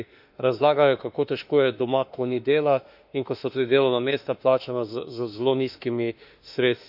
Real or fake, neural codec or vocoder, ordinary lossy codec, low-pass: fake; autoencoder, 48 kHz, 32 numbers a frame, DAC-VAE, trained on Japanese speech; none; 5.4 kHz